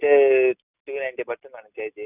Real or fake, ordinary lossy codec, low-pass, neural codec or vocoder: real; none; 3.6 kHz; none